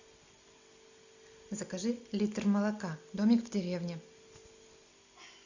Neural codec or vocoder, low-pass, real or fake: none; 7.2 kHz; real